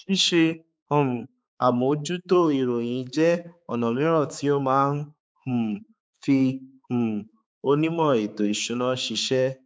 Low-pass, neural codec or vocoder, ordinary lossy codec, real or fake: none; codec, 16 kHz, 4 kbps, X-Codec, HuBERT features, trained on balanced general audio; none; fake